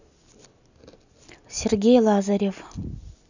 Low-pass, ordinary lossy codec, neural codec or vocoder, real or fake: 7.2 kHz; none; none; real